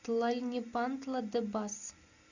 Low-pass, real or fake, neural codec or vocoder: 7.2 kHz; real; none